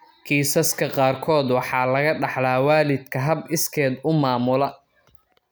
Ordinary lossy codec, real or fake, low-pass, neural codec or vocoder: none; real; none; none